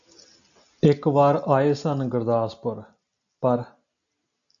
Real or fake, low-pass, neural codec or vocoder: real; 7.2 kHz; none